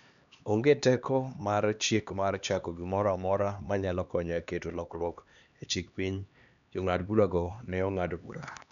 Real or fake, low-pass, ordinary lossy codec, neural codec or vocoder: fake; 7.2 kHz; none; codec, 16 kHz, 2 kbps, X-Codec, HuBERT features, trained on LibriSpeech